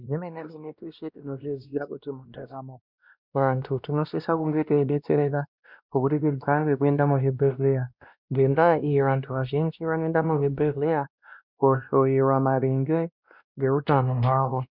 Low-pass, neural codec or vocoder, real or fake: 5.4 kHz; codec, 16 kHz, 1 kbps, X-Codec, WavLM features, trained on Multilingual LibriSpeech; fake